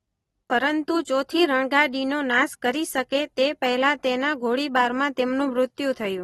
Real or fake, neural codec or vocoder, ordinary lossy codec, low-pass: real; none; AAC, 32 kbps; 19.8 kHz